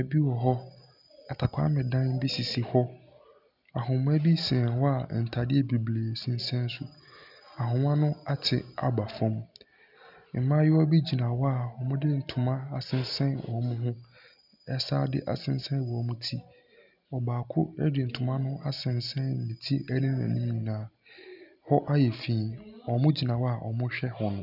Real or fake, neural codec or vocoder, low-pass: real; none; 5.4 kHz